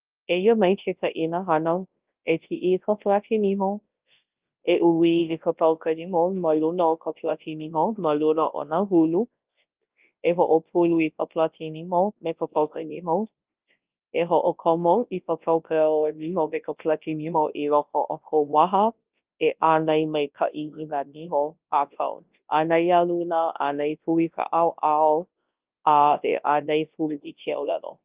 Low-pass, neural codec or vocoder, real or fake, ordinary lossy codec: 3.6 kHz; codec, 24 kHz, 0.9 kbps, WavTokenizer, large speech release; fake; Opus, 32 kbps